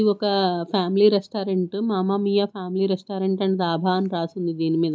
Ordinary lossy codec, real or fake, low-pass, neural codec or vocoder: none; real; none; none